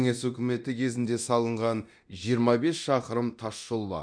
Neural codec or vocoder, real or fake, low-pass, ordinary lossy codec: codec, 24 kHz, 0.9 kbps, DualCodec; fake; 9.9 kHz; none